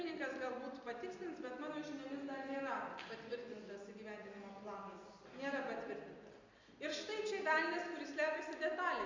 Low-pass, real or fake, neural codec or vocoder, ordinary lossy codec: 7.2 kHz; real; none; MP3, 64 kbps